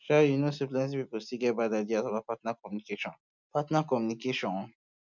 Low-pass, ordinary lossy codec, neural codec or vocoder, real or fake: 7.2 kHz; none; none; real